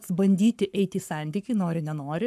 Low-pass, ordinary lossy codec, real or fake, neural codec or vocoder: 14.4 kHz; AAC, 96 kbps; fake; codec, 44.1 kHz, 7.8 kbps, Pupu-Codec